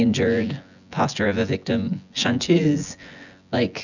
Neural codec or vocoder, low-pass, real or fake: vocoder, 24 kHz, 100 mel bands, Vocos; 7.2 kHz; fake